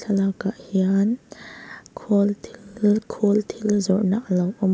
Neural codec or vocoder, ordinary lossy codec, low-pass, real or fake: none; none; none; real